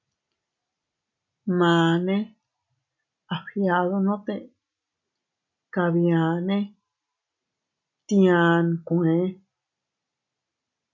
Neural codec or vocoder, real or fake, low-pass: none; real; 7.2 kHz